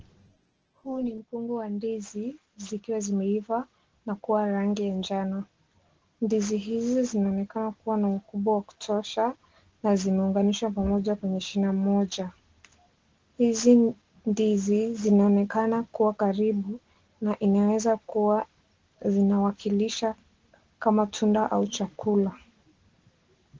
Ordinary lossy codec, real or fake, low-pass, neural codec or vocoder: Opus, 16 kbps; real; 7.2 kHz; none